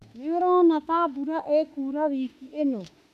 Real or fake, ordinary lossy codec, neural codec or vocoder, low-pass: fake; none; autoencoder, 48 kHz, 32 numbers a frame, DAC-VAE, trained on Japanese speech; 14.4 kHz